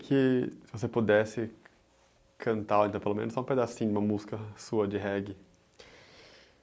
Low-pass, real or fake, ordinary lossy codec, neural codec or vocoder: none; real; none; none